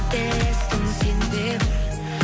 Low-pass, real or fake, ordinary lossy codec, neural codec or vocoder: none; real; none; none